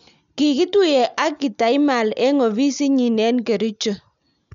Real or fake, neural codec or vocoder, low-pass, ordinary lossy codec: real; none; 7.2 kHz; none